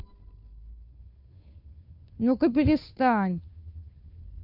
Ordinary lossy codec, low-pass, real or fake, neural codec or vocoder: none; 5.4 kHz; fake; codec, 16 kHz, 2 kbps, FunCodec, trained on Chinese and English, 25 frames a second